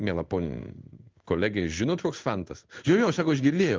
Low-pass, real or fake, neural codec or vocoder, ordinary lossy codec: 7.2 kHz; fake; codec, 16 kHz in and 24 kHz out, 1 kbps, XY-Tokenizer; Opus, 24 kbps